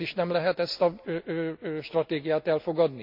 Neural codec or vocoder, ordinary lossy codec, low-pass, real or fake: none; MP3, 48 kbps; 5.4 kHz; real